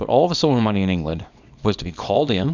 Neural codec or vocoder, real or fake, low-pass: codec, 24 kHz, 0.9 kbps, WavTokenizer, small release; fake; 7.2 kHz